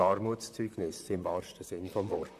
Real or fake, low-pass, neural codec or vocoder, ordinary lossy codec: fake; 14.4 kHz; vocoder, 44.1 kHz, 128 mel bands, Pupu-Vocoder; none